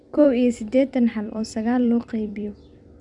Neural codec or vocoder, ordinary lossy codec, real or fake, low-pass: vocoder, 44.1 kHz, 128 mel bands every 256 samples, BigVGAN v2; none; fake; 10.8 kHz